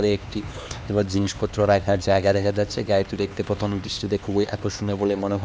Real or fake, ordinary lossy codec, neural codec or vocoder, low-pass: fake; none; codec, 16 kHz, 2 kbps, X-Codec, HuBERT features, trained on LibriSpeech; none